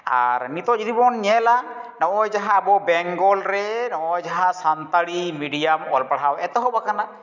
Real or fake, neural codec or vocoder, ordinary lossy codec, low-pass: real; none; none; 7.2 kHz